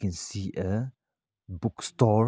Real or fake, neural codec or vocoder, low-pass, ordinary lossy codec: real; none; none; none